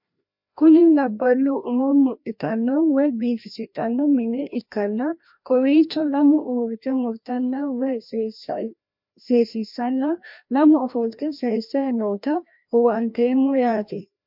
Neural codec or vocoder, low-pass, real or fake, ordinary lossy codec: codec, 16 kHz, 1 kbps, FreqCodec, larger model; 5.4 kHz; fake; MP3, 48 kbps